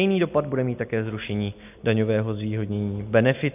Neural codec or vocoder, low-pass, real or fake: none; 3.6 kHz; real